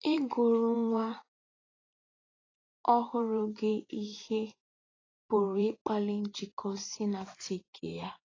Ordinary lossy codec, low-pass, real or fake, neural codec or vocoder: AAC, 32 kbps; 7.2 kHz; fake; vocoder, 44.1 kHz, 128 mel bands every 512 samples, BigVGAN v2